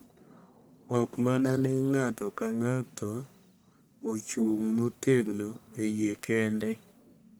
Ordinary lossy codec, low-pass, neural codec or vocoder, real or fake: none; none; codec, 44.1 kHz, 1.7 kbps, Pupu-Codec; fake